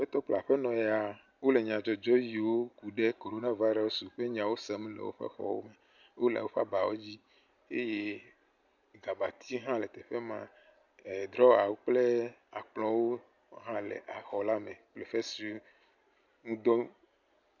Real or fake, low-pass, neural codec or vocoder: real; 7.2 kHz; none